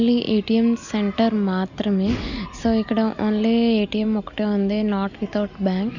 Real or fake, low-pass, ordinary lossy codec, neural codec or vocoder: real; 7.2 kHz; none; none